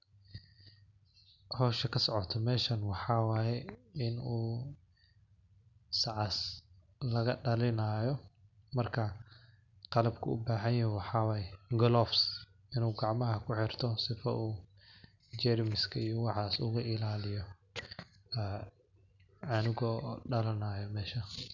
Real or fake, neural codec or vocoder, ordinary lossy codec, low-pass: real; none; none; 7.2 kHz